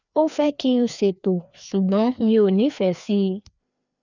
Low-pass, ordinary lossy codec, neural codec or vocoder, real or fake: 7.2 kHz; none; codec, 16 kHz, 2 kbps, FreqCodec, larger model; fake